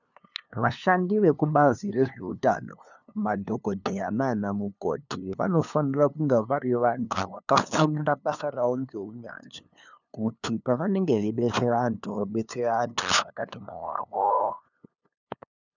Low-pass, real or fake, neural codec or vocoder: 7.2 kHz; fake; codec, 16 kHz, 2 kbps, FunCodec, trained on LibriTTS, 25 frames a second